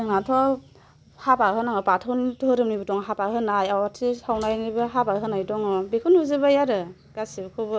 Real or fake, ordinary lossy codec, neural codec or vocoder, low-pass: real; none; none; none